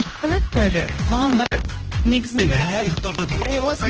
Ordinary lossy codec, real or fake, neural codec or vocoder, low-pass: Opus, 16 kbps; fake; codec, 16 kHz, 1 kbps, X-Codec, HuBERT features, trained on general audio; 7.2 kHz